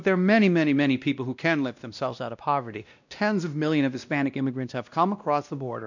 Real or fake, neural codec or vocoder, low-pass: fake; codec, 16 kHz, 1 kbps, X-Codec, WavLM features, trained on Multilingual LibriSpeech; 7.2 kHz